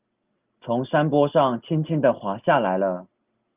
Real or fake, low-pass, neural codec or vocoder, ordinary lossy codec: real; 3.6 kHz; none; Opus, 24 kbps